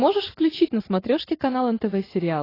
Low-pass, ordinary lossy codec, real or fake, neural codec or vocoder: 5.4 kHz; AAC, 24 kbps; real; none